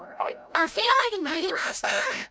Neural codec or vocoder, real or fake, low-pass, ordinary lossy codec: codec, 16 kHz, 0.5 kbps, FreqCodec, larger model; fake; none; none